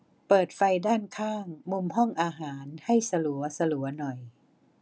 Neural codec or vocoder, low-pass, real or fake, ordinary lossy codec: none; none; real; none